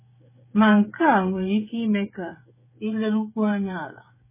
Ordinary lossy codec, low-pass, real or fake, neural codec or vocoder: MP3, 16 kbps; 3.6 kHz; fake; codec, 16 kHz, 16 kbps, FreqCodec, smaller model